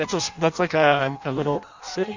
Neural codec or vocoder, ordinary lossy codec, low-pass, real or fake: codec, 16 kHz in and 24 kHz out, 0.6 kbps, FireRedTTS-2 codec; Opus, 64 kbps; 7.2 kHz; fake